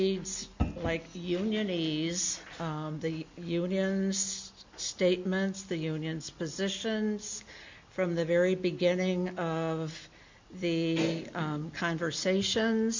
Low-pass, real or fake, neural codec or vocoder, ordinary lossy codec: 7.2 kHz; real; none; MP3, 64 kbps